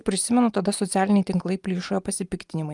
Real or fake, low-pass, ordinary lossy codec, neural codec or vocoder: real; 10.8 kHz; Opus, 32 kbps; none